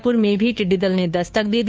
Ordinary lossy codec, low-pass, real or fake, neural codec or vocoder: none; none; fake; codec, 16 kHz, 2 kbps, FunCodec, trained on Chinese and English, 25 frames a second